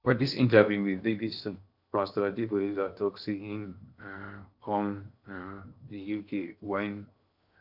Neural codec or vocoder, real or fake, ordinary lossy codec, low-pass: codec, 16 kHz in and 24 kHz out, 0.8 kbps, FocalCodec, streaming, 65536 codes; fake; none; 5.4 kHz